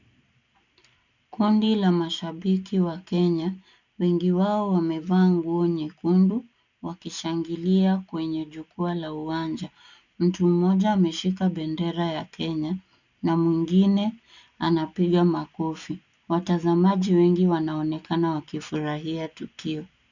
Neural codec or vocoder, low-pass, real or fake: none; 7.2 kHz; real